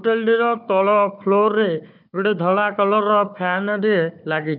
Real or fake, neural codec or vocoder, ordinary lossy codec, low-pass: fake; codec, 16 kHz, 4 kbps, FunCodec, trained on Chinese and English, 50 frames a second; none; 5.4 kHz